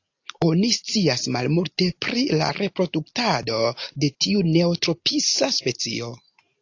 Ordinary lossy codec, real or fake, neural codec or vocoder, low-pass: AAC, 48 kbps; real; none; 7.2 kHz